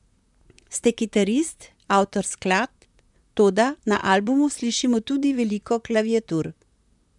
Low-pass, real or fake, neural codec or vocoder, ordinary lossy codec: 10.8 kHz; fake; vocoder, 44.1 kHz, 128 mel bands, Pupu-Vocoder; none